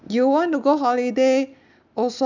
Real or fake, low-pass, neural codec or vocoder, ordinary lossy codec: real; 7.2 kHz; none; none